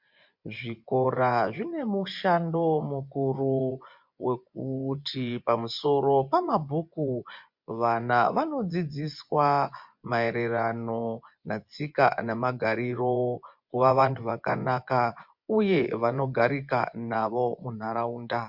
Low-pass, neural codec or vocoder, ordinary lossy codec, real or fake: 5.4 kHz; vocoder, 24 kHz, 100 mel bands, Vocos; MP3, 48 kbps; fake